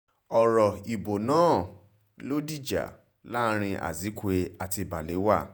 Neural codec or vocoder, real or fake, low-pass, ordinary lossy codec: vocoder, 48 kHz, 128 mel bands, Vocos; fake; none; none